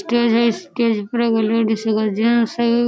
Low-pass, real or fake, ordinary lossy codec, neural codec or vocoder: none; real; none; none